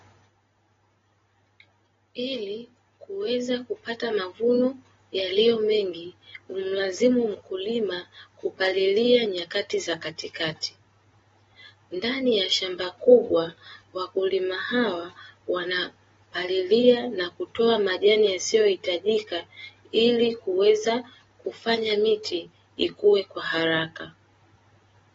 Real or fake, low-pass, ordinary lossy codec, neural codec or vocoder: real; 7.2 kHz; AAC, 24 kbps; none